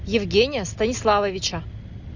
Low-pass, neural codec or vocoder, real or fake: 7.2 kHz; none; real